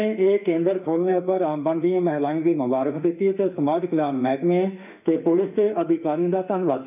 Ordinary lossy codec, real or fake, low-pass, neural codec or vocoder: none; fake; 3.6 kHz; autoencoder, 48 kHz, 32 numbers a frame, DAC-VAE, trained on Japanese speech